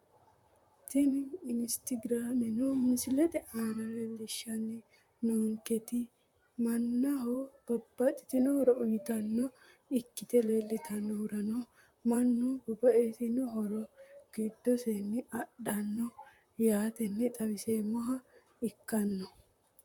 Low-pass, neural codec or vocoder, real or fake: 19.8 kHz; vocoder, 44.1 kHz, 128 mel bands, Pupu-Vocoder; fake